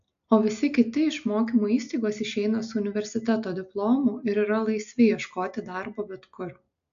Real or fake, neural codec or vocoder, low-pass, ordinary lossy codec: real; none; 7.2 kHz; AAC, 64 kbps